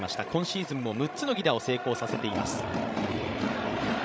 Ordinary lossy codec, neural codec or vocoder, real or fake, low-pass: none; codec, 16 kHz, 16 kbps, FreqCodec, larger model; fake; none